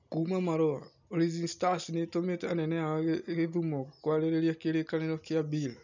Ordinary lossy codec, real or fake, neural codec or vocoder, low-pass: none; real; none; 7.2 kHz